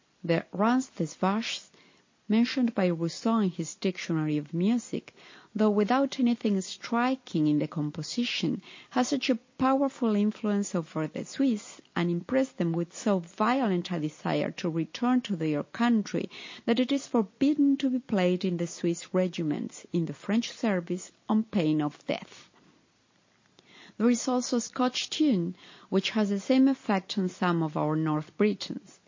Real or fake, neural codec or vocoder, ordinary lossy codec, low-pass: real; none; MP3, 32 kbps; 7.2 kHz